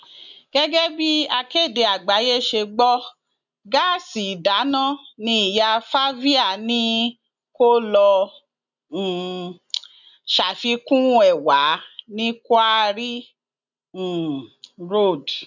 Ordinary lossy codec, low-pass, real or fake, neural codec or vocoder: none; 7.2 kHz; real; none